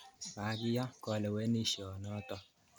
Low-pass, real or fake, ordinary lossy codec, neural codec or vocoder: none; real; none; none